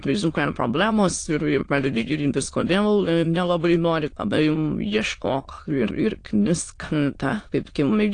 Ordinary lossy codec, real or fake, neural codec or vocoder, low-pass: AAC, 48 kbps; fake; autoencoder, 22.05 kHz, a latent of 192 numbers a frame, VITS, trained on many speakers; 9.9 kHz